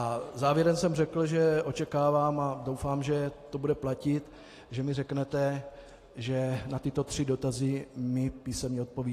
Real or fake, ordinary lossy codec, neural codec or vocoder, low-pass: real; AAC, 48 kbps; none; 14.4 kHz